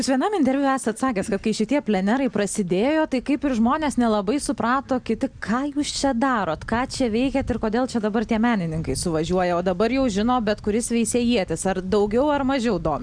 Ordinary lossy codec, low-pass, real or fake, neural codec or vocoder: Opus, 64 kbps; 9.9 kHz; real; none